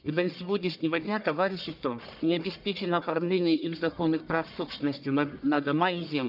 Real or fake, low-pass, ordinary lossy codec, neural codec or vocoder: fake; 5.4 kHz; none; codec, 44.1 kHz, 1.7 kbps, Pupu-Codec